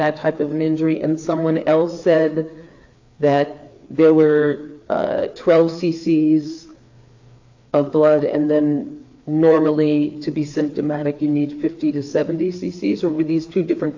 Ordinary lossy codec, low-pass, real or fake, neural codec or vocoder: AAC, 48 kbps; 7.2 kHz; fake; codec, 16 kHz, 4 kbps, FreqCodec, larger model